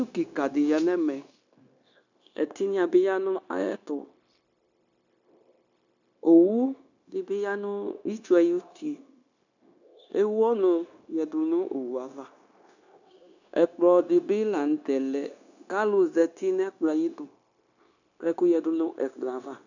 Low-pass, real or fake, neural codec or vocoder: 7.2 kHz; fake; codec, 16 kHz, 0.9 kbps, LongCat-Audio-Codec